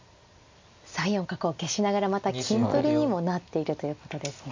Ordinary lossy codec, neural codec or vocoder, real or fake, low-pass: MP3, 48 kbps; none; real; 7.2 kHz